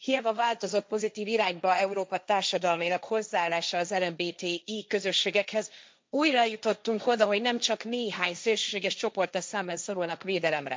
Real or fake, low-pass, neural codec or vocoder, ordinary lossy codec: fake; none; codec, 16 kHz, 1.1 kbps, Voila-Tokenizer; none